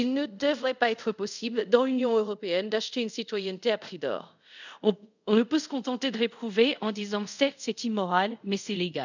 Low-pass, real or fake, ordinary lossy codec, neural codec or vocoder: 7.2 kHz; fake; none; codec, 24 kHz, 0.5 kbps, DualCodec